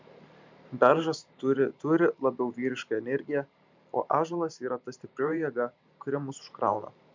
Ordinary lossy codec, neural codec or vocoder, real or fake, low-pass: MP3, 64 kbps; vocoder, 44.1 kHz, 128 mel bands every 512 samples, BigVGAN v2; fake; 7.2 kHz